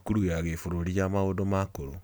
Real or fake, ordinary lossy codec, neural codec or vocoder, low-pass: real; none; none; none